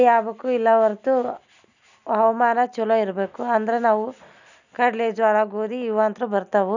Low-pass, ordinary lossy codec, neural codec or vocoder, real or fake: 7.2 kHz; none; none; real